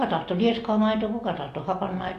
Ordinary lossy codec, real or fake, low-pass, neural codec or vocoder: AAC, 48 kbps; real; 14.4 kHz; none